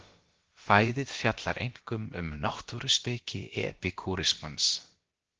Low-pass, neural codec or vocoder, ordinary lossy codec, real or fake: 7.2 kHz; codec, 16 kHz, about 1 kbps, DyCAST, with the encoder's durations; Opus, 16 kbps; fake